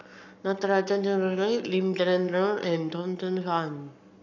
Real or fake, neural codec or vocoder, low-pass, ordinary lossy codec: fake; codec, 44.1 kHz, 7.8 kbps, Pupu-Codec; 7.2 kHz; none